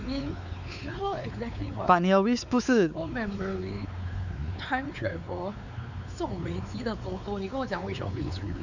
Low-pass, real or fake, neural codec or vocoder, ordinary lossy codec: 7.2 kHz; fake; codec, 16 kHz, 4 kbps, X-Codec, WavLM features, trained on Multilingual LibriSpeech; none